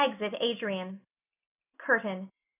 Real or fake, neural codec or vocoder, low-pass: real; none; 3.6 kHz